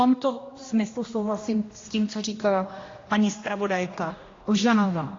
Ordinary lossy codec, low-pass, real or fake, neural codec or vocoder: AAC, 32 kbps; 7.2 kHz; fake; codec, 16 kHz, 1 kbps, X-Codec, HuBERT features, trained on general audio